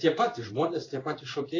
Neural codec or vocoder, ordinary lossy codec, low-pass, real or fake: codec, 16 kHz in and 24 kHz out, 1 kbps, XY-Tokenizer; AAC, 32 kbps; 7.2 kHz; fake